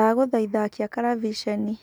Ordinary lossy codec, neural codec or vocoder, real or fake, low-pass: none; none; real; none